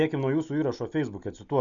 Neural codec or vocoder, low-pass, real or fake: none; 7.2 kHz; real